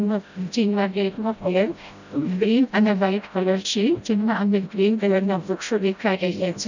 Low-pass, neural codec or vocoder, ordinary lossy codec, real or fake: 7.2 kHz; codec, 16 kHz, 0.5 kbps, FreqCodec, smaller model; none; fake